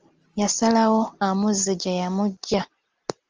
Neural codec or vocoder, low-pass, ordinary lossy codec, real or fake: none; 7.2 kHz; Opus, 24 kbps; real